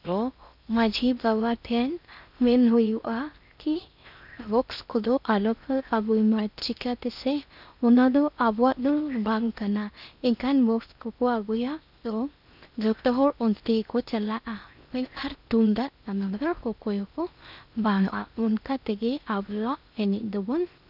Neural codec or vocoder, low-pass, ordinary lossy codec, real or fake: codec, 16 kHz in and 24 kHz out, 0.6 kbps, FocalCodec, streaming, 4096 codes; 5.4 kHz; none; fake